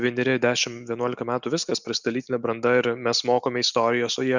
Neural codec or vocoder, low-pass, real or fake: none; 7.2 kHz; real